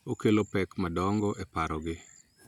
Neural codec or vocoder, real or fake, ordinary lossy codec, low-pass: none; real; none; 19.8 kHz